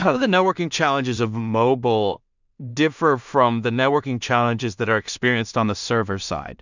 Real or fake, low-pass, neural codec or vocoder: fake; 7.2 kHz; codec, 16 kHz in and 24 kHz out, 0.4 kbps, LongCat-Audio-Codec, two codebook decoder